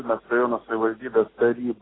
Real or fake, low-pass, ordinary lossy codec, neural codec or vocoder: real; 7.2 kHz; AAC, 16 kbps; none